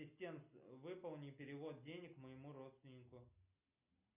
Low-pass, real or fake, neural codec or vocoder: 3.6 kHz; real; none